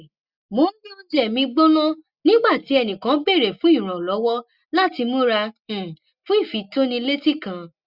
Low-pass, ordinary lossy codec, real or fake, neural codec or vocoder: 5.4 kHz; Opus, 64 kbps; real; none